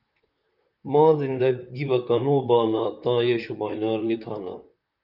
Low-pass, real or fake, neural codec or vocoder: 5.4 kHz; fake; vocoder, 44.1 kHz, 128 mel bands, Pupu-Vocoder